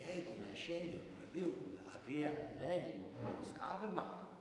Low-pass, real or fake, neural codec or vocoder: 10.8 kHz; fake; codec, 44.1 kHz, 2.6 kbps, SNAC